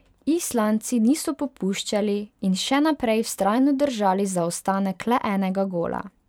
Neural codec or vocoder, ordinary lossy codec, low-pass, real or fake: vocoder, 44.1 kHz, 128 mel bands every 512 samples, BigVGAN v2; none; 19.8 kHz; fake